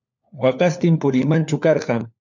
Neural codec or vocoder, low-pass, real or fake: codec, 16 kHz, 4 kbps, FunCodec, trained on LibriTTS, 50 frames a second; 7.2 kHz; fake